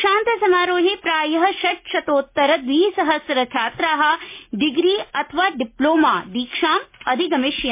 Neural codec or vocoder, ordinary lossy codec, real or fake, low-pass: none; MP3, 24 kbps; real; 3.6 kHz